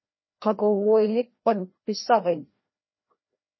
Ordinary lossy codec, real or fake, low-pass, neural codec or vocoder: MP3, 24 kbps; fake; 7.2 kHz; codec, 16 kHz, 1 kbps, FreqCodec, larger model